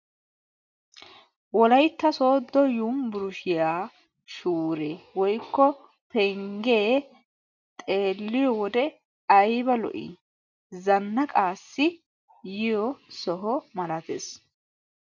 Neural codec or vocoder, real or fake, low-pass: none; real; 7.2 kHz